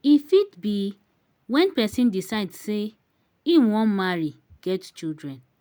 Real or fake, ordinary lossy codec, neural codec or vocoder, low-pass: real; none; none; none